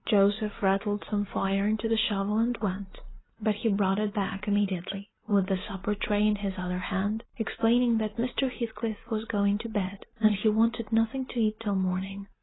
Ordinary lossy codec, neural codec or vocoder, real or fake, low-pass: AAC, 16 kbps; none; real; 7.2 kHz